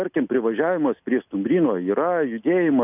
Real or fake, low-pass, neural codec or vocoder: real; 3.6 kHz; none